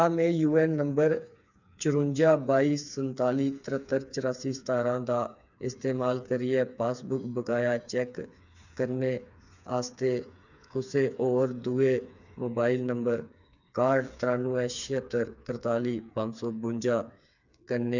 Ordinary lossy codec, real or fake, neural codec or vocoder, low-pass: none; fake; codec, 16 kHz, 4 kbps, FreqCodec, smaller model; 7.2 kHz